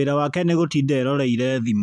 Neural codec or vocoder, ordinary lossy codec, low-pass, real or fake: vocoder, 44.1 kHz, 128 mel bands every 512 samples, BigVGAN v2; MP3, 96 kbps; 9.9 kHz; fake